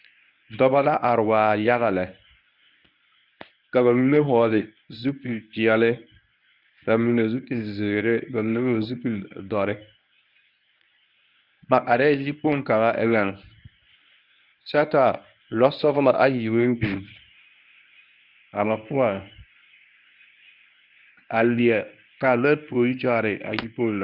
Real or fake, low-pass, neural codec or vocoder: fake; 5.4 kHz; codec, 24 kHz, 0.9 kbps, WavTokenizer, medium speech release version 1